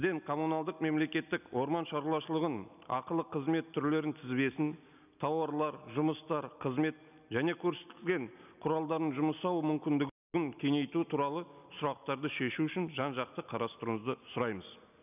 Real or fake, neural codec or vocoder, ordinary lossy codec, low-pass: real; none; none; 3.6 kHz